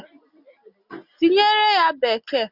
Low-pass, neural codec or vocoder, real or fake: 5.4 kHz; none; real